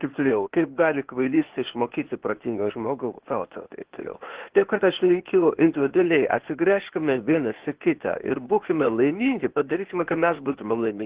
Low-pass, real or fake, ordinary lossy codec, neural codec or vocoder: 3.6 kHz; fake; Opus, 16 kbps; codec, 16 kHz, 0.8 kbps, ZipCodec